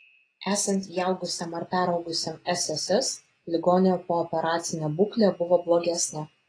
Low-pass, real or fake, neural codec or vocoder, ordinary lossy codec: 9.9 kHz; real; none; AAC, 32 kbps